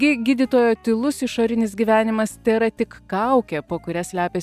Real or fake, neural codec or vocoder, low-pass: real; none; 14.4 kHz